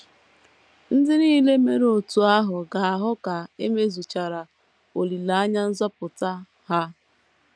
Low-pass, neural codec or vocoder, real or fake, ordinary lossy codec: none; none; real; none